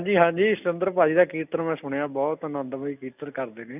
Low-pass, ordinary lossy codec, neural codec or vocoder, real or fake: 3.6 kHz; none; none; real